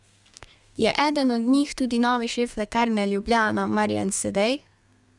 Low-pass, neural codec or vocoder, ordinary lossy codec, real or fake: 10.8 kHz; codec, 32 kHz, 1.9 kbps, SNAC; none; fake